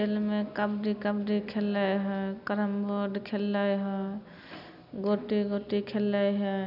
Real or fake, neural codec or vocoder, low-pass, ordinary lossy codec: real; none; 5.4 kHz; none